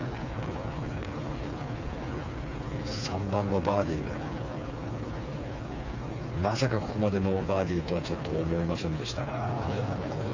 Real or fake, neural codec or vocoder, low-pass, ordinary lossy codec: fake; codec, 16 kHz, 4 kbps, FreqCodec, smaller model; 7.2 kHz; AAC, 48 kbps